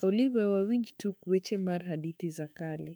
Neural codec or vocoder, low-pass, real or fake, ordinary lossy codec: autoencoder, 48 kHz, 32 numbers a frame, DAC-VAE, trained on Japanese speech; 19.8 kHz; fake; none